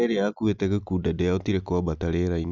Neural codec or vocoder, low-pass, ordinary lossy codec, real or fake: none; 7.2 kHz; none; real